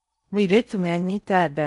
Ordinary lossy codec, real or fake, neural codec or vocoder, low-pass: none; fake; codec, 16 kHz in and 24 kHz out, 0.8 kbps, FocalCodec, streaming, 65536 codes; 10.8 kHz